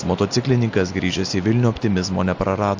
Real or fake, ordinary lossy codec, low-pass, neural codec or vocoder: real; AAC, 48 kbps; 7.2 kHz; none